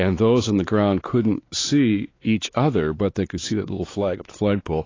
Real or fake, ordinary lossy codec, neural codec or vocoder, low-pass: fake; AAC, 32 kbps; codec, 16 kHz, 4 kbps, X-Codec, WavLM features, trained on Multilingual LibriSpeech; 7.2 kHz